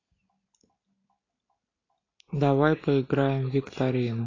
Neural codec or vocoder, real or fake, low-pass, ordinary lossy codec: none; real; 7.2 kHz; AAC, 32 kbps